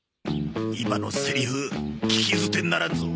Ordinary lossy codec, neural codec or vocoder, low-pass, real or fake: none; none; none; real